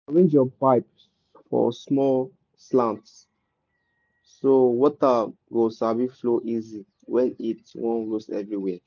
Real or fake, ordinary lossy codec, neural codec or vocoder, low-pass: real; none; none; 7.2 kHz